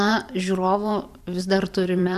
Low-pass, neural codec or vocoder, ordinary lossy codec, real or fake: 14.4 kHz; vocoder, 44.1 kHz, 128 mel bands every 512 samples, BigVGAN v2; MP3, 96 kbps; fake